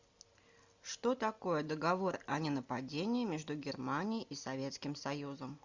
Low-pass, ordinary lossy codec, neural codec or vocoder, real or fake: 7.2 kHz; Opus, 64 kbps; none; real